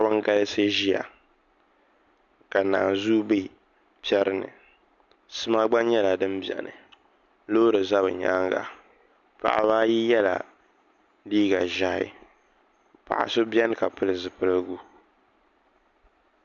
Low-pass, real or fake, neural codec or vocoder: 7.2 kHz; real; none